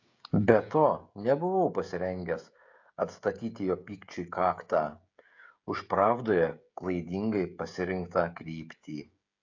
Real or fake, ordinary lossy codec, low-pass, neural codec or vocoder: fake; AAC, 48 kbps; 7.2 kHz; codec, 16 kHz, 16 kbps, FreqCodec, smaller model